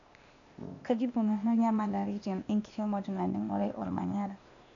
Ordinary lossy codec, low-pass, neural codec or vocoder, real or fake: none; 7.2 kHz; codec, 16 kHz, 0.8 kbps, ZipCodec; fake